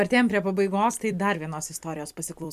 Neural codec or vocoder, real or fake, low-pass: vocoder, 44.1 kHz, 128 mel bands every 256 samples, BigVGAN v2; fake; 14.4 kHz